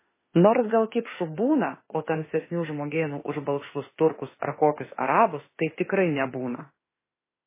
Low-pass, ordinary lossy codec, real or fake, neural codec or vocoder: 3.6 kHz; MP3, 16 kbps; fake; autoencoder, 48 kHz, 32 numbers a frame, DAC-VAE, trained on Japanese speech